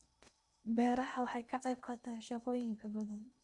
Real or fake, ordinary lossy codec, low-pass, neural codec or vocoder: fake; none; 10.8 kHz; codec, 16 kHz in and 24 kHz out, 0.8 kbps, FocalCodec, streaming, 65536 codes